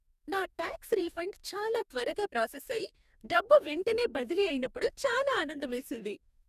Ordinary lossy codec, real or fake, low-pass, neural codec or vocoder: none; fake; 14.4 kHz; codec, 44.1 kHz, 2.6 kbps, DAC